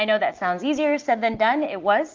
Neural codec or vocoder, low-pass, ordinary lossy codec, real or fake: none; 7.2 kHz; Opus, 24 kbps; real